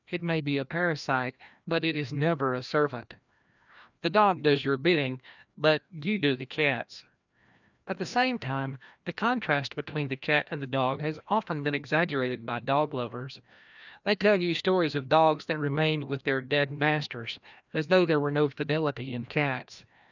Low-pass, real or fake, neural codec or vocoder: 7.2 kHz; fake; codec, 16 kHz, 1 kbps, FreqCodec, larger model